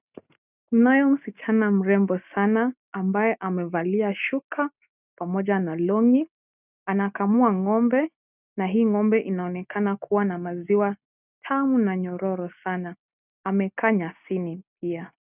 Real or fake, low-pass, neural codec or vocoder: real; 3.6 kHz; none